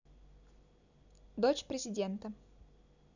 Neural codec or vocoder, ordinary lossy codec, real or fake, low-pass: none; none; real; 7.2 kHz